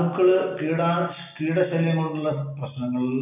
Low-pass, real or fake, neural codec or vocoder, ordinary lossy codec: 3.6 kHz; real; none; none